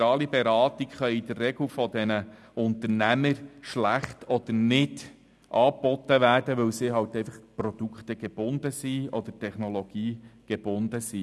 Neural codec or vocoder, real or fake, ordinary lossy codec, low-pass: none; real; none; none